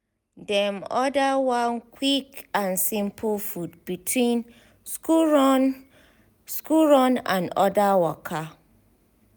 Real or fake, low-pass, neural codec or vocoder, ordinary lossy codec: real; none; none; none